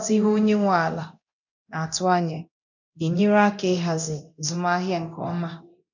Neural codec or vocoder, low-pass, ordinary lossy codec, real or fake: codec, 24 kHz, 0.9 kbps, DualCodec; 7.2 kHz; none; fake